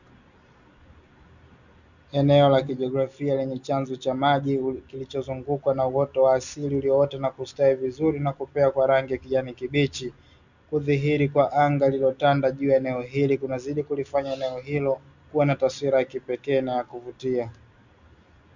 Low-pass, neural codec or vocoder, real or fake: 7.2 kHz; none; real